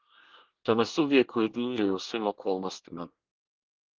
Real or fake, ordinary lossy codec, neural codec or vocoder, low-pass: fake; Opus, 16 kbps; codec, 24 kHz, 1 kbps, SNAC; 7.2 kHz